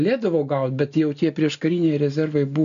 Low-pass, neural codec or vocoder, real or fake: 7.2 kHz; none; real